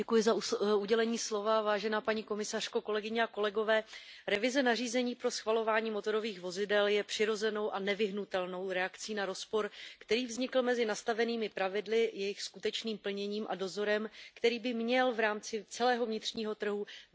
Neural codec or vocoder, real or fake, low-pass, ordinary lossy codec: none; real; none; none